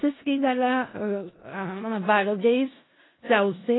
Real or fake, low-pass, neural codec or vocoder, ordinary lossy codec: fake; 7.2 kHz; codec, 16 kHz in and 24 kHz out, 0.4 kbps, LongCat-Audio-Codec, four codebook decoder; AAC, 16 kbps